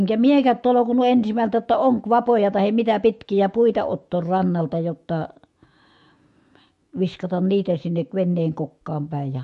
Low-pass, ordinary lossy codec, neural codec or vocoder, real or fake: 14.4 kHz; MP3, 48 kbps; autoencoder, 48 kHz, 128 numbers a frame, DAC-VAE, trained on Japanese speech; fake